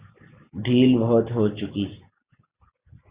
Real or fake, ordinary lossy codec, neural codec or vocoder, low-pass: real; Opus, 64 kbps; none; 3.6 kHz